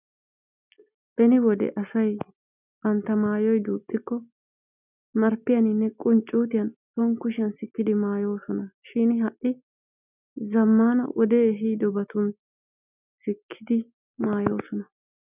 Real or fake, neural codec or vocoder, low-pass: real; none; 3.6 kHz